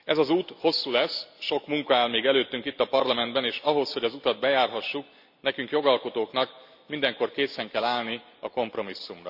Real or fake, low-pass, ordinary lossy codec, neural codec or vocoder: real; 5.4 kHz; none; none